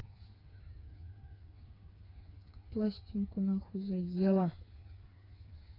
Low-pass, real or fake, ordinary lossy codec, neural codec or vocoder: 5.4 kHz; fake; AAC, 24 kbps; codec, 16 kHz, 4 kbps, FreqCodec, smaller model